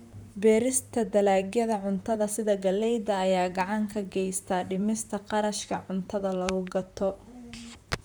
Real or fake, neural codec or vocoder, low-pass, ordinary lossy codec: fake; vocoder, 44.1 kHz, 128 mel bands, Pupu-Vocoder; none; none